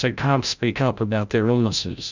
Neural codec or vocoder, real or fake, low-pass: codec, 16 kHz, 0.5 kbps, FreqCodec, larger model; fake; 7.2 kHz